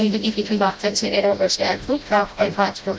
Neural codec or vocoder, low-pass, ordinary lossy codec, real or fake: codec, 16 kHz, 0.5 kbps, FreqCodec, smaller model; none; none; fake